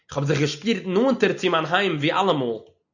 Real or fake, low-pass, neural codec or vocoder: real; 7.2 kHz; none